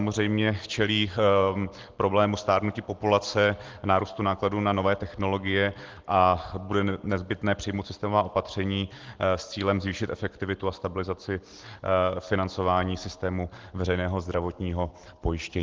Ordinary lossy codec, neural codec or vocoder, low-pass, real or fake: Opus, 16 kbps; none; 7.2 kHz; real